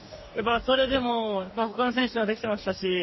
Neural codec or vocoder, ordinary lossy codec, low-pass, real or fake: codec, 44.1 kHz, 2.6 kbps, DAC; MP3, 24 kbps; 7.2 kHz; fake